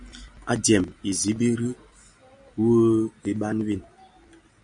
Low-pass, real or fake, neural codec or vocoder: 9.9 kHz; real; none